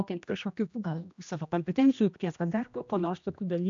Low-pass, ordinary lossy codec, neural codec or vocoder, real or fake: 7.2 kHz; AAC, 64 kbps; codec, 16 kHz, 1 kbps, X-Codec, HuBERT features, trained on general audio; fake